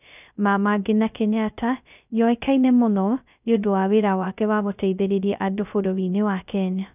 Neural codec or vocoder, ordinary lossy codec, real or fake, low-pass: codec, 16 kHz, 0.2 kbps, FocalCodec; none; fake; 3.6 kHz